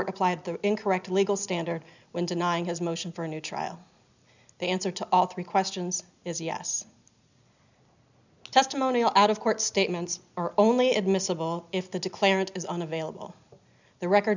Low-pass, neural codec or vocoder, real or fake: 7.2 kHz; none; real